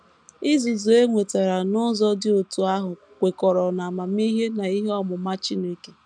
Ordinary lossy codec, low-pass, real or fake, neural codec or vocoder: none; 9.9 kHz; real; none